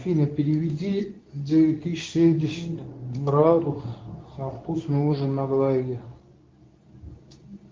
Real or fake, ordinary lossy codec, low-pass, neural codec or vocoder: fake; Opus, 32 kbps; 7.2 kHz; codec, 24 kHz, 0.9 kbps, WavTokenizer, medium speech release version 2